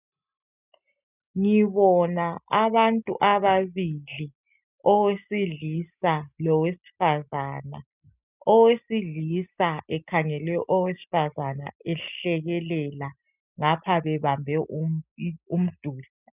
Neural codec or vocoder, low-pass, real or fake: none; 3.6 kHz; real